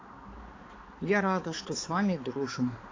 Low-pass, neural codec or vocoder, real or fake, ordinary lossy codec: 7.2 kHz; codec, 16 kHz, 4 kbps, X-Codec, HuBERT features, trained on balanced general audio; fake; AAC, 32 kbps